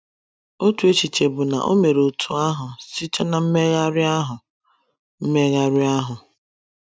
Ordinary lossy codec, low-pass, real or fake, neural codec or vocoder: none; none; real; none